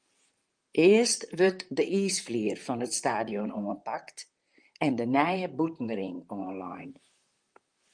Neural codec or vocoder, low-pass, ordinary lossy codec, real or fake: vocoder, 44.1 kHz, 128 mel bands, Pupu-Vocoder; 9.9 kHz; Opus, 32 kbps; fake